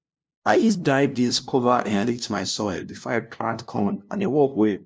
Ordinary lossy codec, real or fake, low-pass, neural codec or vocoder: none; fake; none; codec, 16 kHz, 0.5 kbps, FunCodec, trained on LibriTTS, 25 frames a second